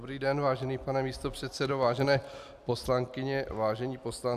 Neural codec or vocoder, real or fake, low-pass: none; real; 14.4 kHz